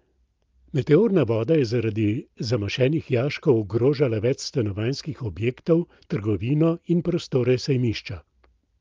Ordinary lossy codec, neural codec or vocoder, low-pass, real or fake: Opus, 32 kbps; none; 7.2 kHz; real